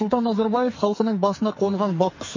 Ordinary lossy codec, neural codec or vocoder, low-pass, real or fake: MP3, 32 kbps; codec, 44.1 kHz, 2.6 kbps, SNAC; 7.2 kHz; fake